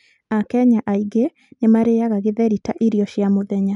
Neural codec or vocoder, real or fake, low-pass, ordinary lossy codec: none; real; 10.8 kHz; none